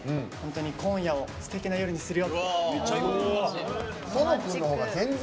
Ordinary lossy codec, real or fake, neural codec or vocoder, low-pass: none; real; none; none